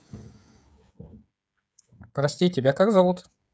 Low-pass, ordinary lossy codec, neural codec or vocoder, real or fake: none; none; codec, 16 kHz, 16 kbps, FreqCodec, smaller model; fake